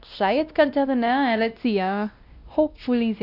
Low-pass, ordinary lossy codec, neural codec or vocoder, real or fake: 5.4 kHz; none; codec, 16 kHz, 1 kbps, X-Codec, WavLM features, trained on Multilingual LibriSpeech; fake